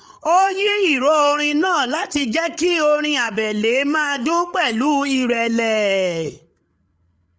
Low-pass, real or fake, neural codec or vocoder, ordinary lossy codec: none; fake; codec, 16 kHz, 8 kbps, FreqCodec, larger model; none